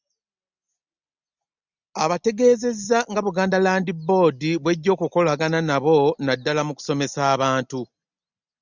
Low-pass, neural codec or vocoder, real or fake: 7.2 kHz; none; real